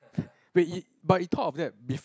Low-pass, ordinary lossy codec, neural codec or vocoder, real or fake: none; none; none; real